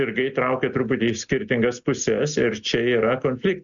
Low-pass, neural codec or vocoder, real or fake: 7.2 kHz; none; real